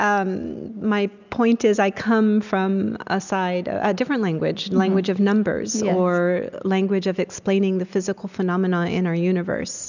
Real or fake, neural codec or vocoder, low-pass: real; none; 7.2 kHz